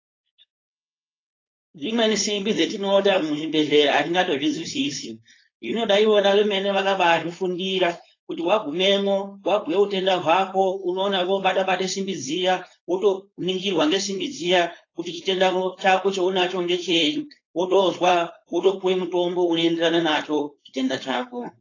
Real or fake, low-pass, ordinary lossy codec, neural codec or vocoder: fake; 7.2 kHz; AAC, 32 kbps; codec, 16 kHz, 4.8 kbps, FACodec